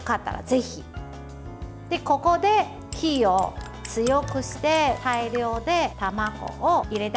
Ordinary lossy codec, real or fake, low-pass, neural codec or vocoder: none; real; none; none